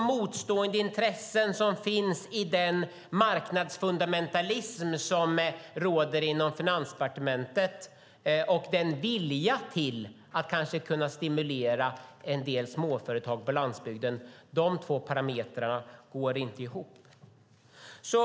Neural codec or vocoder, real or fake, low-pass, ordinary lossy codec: none; real; none; none